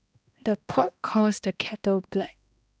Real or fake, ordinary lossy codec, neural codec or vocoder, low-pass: fake; none; codec, 16 kHz, 1 kbps, X-Codec, HuBERT features, trained on balanced general audio; none